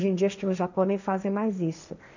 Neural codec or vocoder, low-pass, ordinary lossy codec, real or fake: codec, 16 kHz, 1.1 kbps, Voila-Tokenizer; 7.2 kHz; none; fake